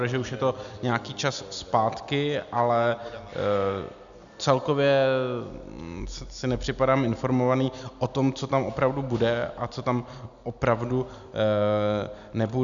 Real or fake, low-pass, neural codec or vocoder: real; 7.2 kHz; none